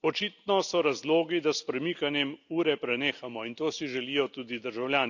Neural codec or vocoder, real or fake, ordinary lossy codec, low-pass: none; real; none; 7.2 kHz